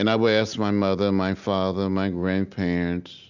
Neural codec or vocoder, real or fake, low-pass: none; real; 7.2 kHz